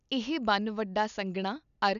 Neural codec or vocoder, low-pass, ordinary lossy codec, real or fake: none; 7.2 kHz; none; real